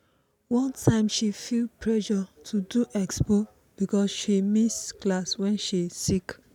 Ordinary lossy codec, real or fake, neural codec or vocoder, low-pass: none; real; none; 19.8 kHz